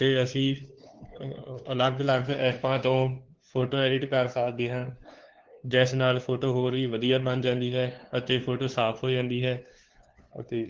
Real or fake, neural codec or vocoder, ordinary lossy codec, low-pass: fake; codec, 16 kHz, 2 kbps, FunCodec, trained on LibriTTS, 25 frames a second; Opus, 16 kbps; 7.2 kHz